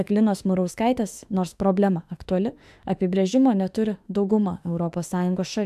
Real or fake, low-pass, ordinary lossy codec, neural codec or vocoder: fake; 14.4 kHz; AAC, 96 kbps; autoencoder, 48 kHz, 32 numbers a frame, DAC-VAE, trained on Japanese speech